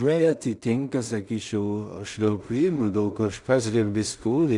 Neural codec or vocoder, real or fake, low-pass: codec, 16 kHz in and 24 kHz out, 0.4 kbps, LongCat-Audio-Codec, two codebook decoder; fake; 10.8 kHz